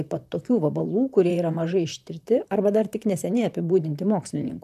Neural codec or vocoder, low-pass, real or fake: vocoder, 44.1 kHz, 128 mel bands, Pupu-Vocoder; 14.4 kHz; fake